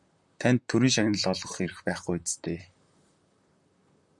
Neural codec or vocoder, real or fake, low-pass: vocoder, 44.1 kHz, 128 mel bands, Pupu-Vocoder; fake; 10.8 kHz